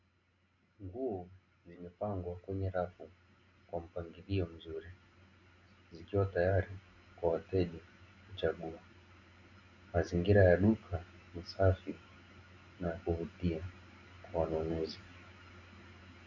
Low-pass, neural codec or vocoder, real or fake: 7.2 kHz; none; real